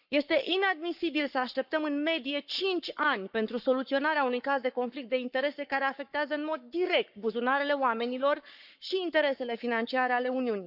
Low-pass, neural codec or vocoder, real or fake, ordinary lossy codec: 5.4 kHz; codec, 44.1 kHz, 7.8 kbps, Pupu-Codec; fake; none